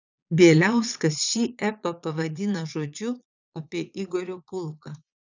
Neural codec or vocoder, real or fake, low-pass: vocoder, 22.05 kHz, 80 mel bands, Vocos; fake; 7.2 kHz